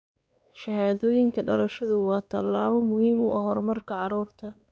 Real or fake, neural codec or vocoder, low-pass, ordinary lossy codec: fake; codec, 16 kHz, 2 kbps, X-Codec, WavLM features, trained on Multilingual LibriSpeech; none; none